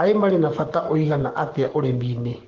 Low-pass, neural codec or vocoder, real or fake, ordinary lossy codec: 7.2 kHz; codec, 44.1 kHz, 7.8 kbps, Pupu-Codec; fake; Opus, 16 kbps